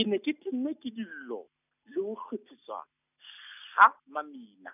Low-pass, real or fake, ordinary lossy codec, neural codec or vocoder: 3.6 kHz; real; none; none